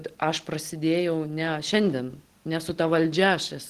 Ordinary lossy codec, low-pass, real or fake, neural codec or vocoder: Opus, 16 kbps; 14.4 kHz; real; none